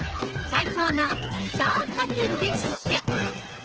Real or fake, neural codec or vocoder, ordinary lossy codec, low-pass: fake; codec, 16 kHz, 4 kbps, FreqCodec, smaller model; Opus, 16 kbps; 7.2 kHz